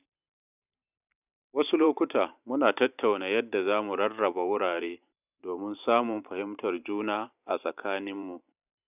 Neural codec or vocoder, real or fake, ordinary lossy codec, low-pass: vocoder, 44.1 kHz, 128 mel bands every 512 samples, BigVGAN v2; fake; none; 3.6 kHz